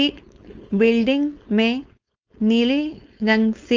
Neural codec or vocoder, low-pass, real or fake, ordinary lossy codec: codec, 16 kHz, 4.8 kbps, FACodec; 7.2 kHz; fake; Opus, 24 kbps